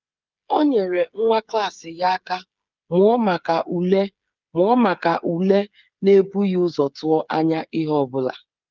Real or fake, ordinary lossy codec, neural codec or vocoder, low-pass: fake; Opus, 24 kbps; codec, 16 kHz, 8 kbps, FreqCodec, smaller model; 7.2 kHz